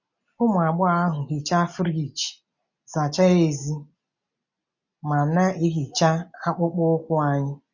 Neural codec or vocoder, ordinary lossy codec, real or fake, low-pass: none; none; real; 7.2 kHz